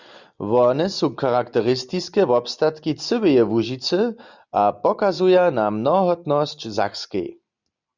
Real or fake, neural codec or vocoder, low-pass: real; none; 7.2 kHz